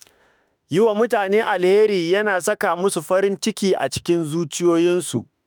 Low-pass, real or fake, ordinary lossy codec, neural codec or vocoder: none; fake; none; autoencoder, 48 kHz, 32 numbers a frame, DAC-VAE, trained on Japanese speech